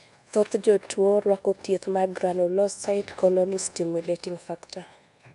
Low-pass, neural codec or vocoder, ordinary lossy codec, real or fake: 10.8 kHz; codec, 24 kHz, 1.2 kbps, DualCodec; none; fake